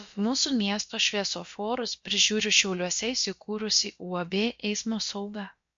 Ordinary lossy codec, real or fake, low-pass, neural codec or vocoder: MP3, 48 kbps; fake; 7.2 kHz; codec, 16 kHz, about 1 kbps, DyCAST, with the encoder's durations